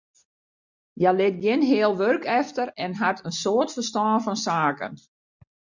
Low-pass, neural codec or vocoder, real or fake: 7.2 kHz; none; real